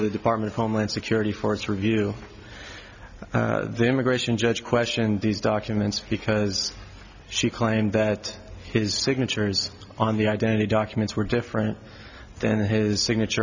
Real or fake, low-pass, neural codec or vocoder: real; 7.2 kHz; none